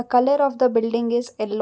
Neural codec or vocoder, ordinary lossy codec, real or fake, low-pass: none; none; real; none